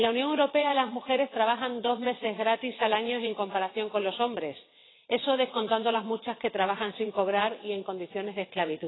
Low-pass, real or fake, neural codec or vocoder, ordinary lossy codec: 7.2 kHz; fake; vocoder, 22.05 kHz, 80 mel bands, WaveNeXt; AAC, 16 kbps